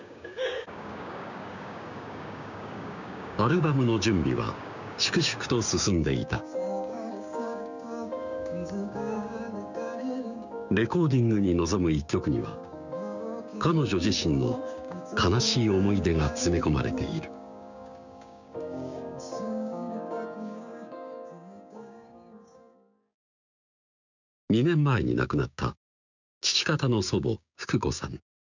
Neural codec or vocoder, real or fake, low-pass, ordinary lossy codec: codec, 16 kHz, 6 kbps, DAC; fake; 7.2 kHz; none